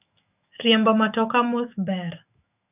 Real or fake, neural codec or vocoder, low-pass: fake; autoencoder, 48 kHz, 128 numbers a frame, DAC-VAE, trained on Japanese speech; 3.6 kHz